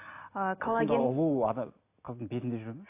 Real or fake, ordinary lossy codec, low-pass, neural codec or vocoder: real; AAC, 24 kbps; 3.6 kHz; none